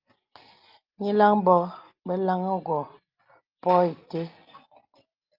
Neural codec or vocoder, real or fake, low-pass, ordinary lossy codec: none; real; 5.4 kHz; Opus, 32 kbps